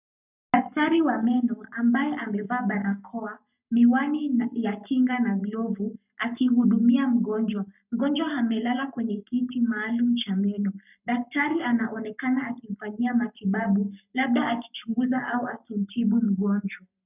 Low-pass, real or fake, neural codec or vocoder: 3.6 kHz; fake; codec, 44.1 kHz, 7.8 kbps, Pupu-Codec